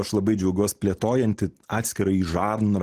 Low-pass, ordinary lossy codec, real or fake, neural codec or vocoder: 14.4 kHz; Opus, 16 kbps; real; none